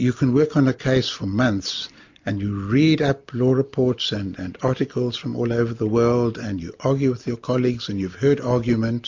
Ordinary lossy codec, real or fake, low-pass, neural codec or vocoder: MP3, 48 kbps; real; 7.2 kHz; none